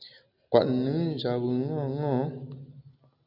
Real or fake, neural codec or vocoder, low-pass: real; none; 5.4 kHz